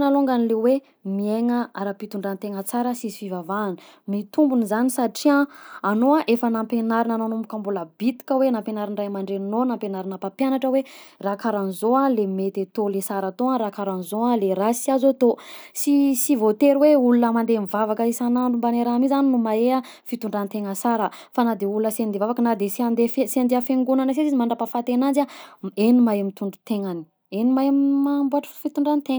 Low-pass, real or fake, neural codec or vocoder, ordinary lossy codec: none; real; none; none